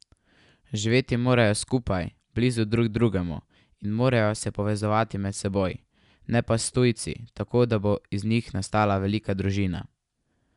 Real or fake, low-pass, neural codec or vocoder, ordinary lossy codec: real; 10.8 kHz; none; none